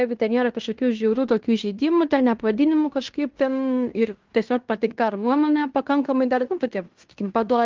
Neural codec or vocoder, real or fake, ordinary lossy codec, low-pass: codec, 16 kHz in and 24 kHz out, 0.9 kbps, LongCat-Audio-Codec, fine tuned four codebook decoder; fake; Opus, 24 kbps; 7.2 kHz